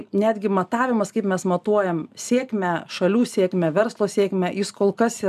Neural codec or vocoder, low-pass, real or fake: none; 14.4 kHz; real